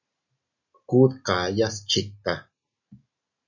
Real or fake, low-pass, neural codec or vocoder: real; 7.2 kHz; none